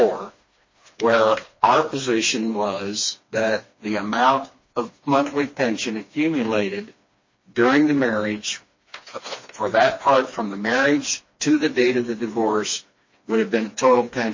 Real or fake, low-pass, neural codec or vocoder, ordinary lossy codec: fake; 7.2 kHz; codec, 16 kHz, 2 kbps, FreqCodec, smaller model; MP3, 32 kbps